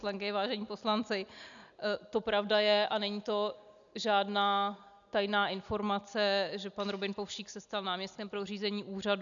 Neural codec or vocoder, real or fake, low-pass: none; real; 7.2 kHz